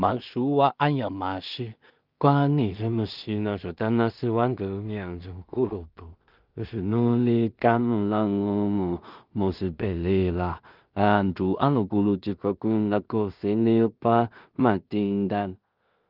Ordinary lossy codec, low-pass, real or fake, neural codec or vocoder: Opus, 32 kbps; 5.4 kHz; fake; codec, 16 kHz in and 24 kHz out, 0.4 kbps, LongCat-Audio-Codec, two codebook decoder